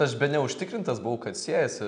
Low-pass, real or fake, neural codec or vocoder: 9.9 kHz; real; none